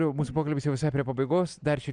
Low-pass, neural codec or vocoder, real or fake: 9.9 kHz; none; real